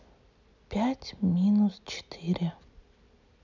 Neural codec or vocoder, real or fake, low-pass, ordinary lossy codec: none; real; 7.2 kHz; none